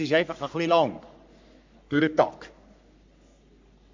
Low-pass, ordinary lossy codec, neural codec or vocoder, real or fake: 7.2 kHz; MP3, 64 kbps; codec, 44.1 kHz, 3.4 kbps, Pupu-Codec; fake